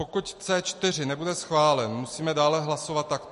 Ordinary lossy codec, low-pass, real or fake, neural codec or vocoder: MP3, 48 kbps; 14.4 kHz; real; none